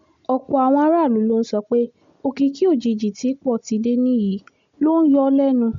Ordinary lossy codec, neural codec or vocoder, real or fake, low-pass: MP3, 48 kbps; none; real; 7.2 kHz